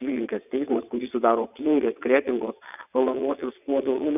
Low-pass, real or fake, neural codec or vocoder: 3.6 kHz; fake; vocoder, 22.05 kHz, 80 mel bands, WaveNeXt